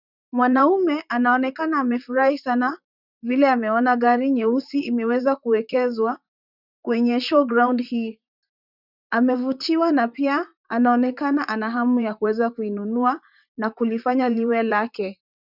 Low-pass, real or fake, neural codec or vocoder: 5.4 kHz; fake; vocoder, 44.1 kHz, 128 mel bands, Pupu-Vocoder